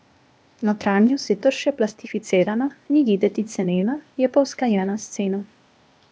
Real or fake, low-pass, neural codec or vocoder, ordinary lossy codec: fake; none; codec, 16 kHz, 0.8 kbps, ZipCodec; none